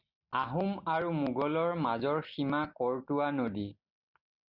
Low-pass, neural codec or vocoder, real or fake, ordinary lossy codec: 5.4 kHz; none; real; Opus, 64 kbps